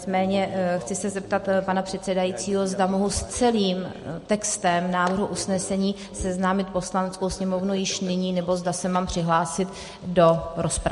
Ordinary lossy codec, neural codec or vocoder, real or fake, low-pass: MP3, 48 kbps; none; real; 14.4 kHz